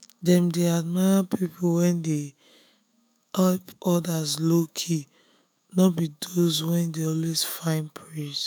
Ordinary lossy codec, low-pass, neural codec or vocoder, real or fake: none; none; autoencoder, 48 kHz, 128 numbers a frame, DAC-VAE, trained on Japanese speech; fake